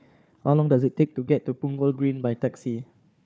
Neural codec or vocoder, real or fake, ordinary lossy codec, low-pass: codec, 16 kHz, 4 kbps, FunCodec, trained on Chinese and English, 50 frames a second; fake; none; none